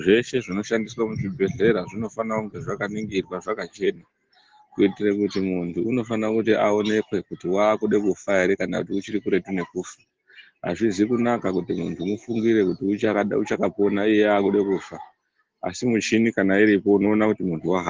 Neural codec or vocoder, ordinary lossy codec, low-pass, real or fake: none; Opus, 16 kbps; 7.2 kHz; real